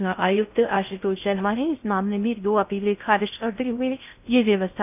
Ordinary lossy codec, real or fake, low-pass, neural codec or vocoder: none; fake; 3.6 kHz; codec, 16 kHz in and 24 kHz out, 0.6 kbps, FocalCodec, streaming, 4096 codes